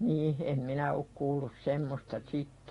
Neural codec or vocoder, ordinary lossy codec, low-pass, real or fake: none; AAC, 32 kbps; 10.8 kHz; real